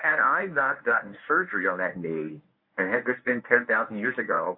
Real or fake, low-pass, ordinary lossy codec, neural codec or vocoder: fake; 5.4 kHz; MP3, 32 kbps; codec, 24 kHz, 0.9 kbps, WavTokenizer, medium speech release version 1